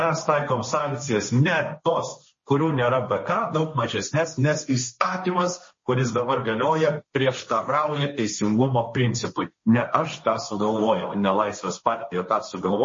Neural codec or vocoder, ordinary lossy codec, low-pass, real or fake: codec, 16 kHz, 1.1 kbps, Voila-Tokenizer; MP3, 32 kbps; 7.2 kHz; fake